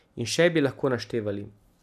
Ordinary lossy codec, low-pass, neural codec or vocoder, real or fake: none; 14.4 kHz; none; real